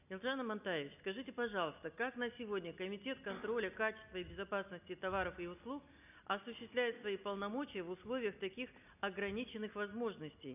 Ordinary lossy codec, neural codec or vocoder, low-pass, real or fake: none; none; 3.6 kHz; real